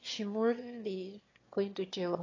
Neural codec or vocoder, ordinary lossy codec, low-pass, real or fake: autoencoder, 22.05 kHz, a latent of 192 numbers a frame, VITS, trained on one speaker; AAC, 32 kbps; 7.2 kHz; fake